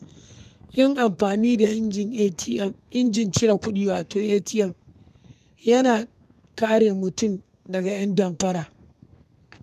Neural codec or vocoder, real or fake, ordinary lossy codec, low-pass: codec, 32 kHz, 1.9 kbps, SNAC; fake; none; 14.4 kHz